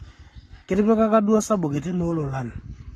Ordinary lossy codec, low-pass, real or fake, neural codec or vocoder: AAC, 32 kbps; 19.8 kHz; fake; codec, 44.1 kHz, 7.8 kbps, Pupu-Codec